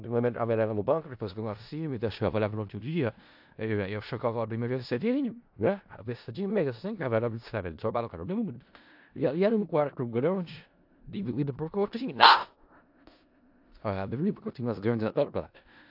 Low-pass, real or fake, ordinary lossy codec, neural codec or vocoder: 5.4 kHz; fake; AAC, 48 kbps; codec, 16 kHz in and 24 kHz out, 0.4 kbps, LongCat-Audio-Codec, four codebook decoder